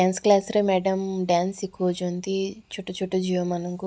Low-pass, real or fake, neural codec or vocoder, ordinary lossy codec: none; real; none; none